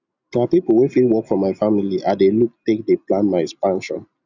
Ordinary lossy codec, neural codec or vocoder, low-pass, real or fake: none; none; 7.2 kHz; real